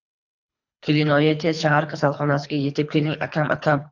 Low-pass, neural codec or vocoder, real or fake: 7.2 kHz; codec, 24 kHz, 3 kbps, HILCodec; fake